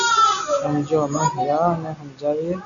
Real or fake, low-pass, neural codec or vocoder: real; 7.2 kHz; none